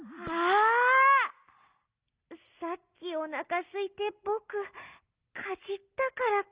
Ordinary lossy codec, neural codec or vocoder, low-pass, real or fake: Opus, 24 kbps; none; 3.6 kHz; real